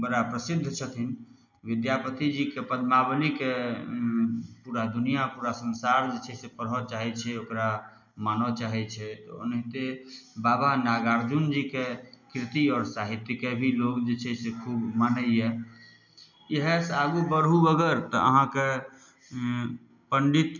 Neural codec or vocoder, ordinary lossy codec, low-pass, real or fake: none; none; 7.2 kHz; real